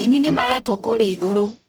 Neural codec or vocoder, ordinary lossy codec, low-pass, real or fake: codec, 44.1 kHz, 0.9 kbps, DAC; none; none; fake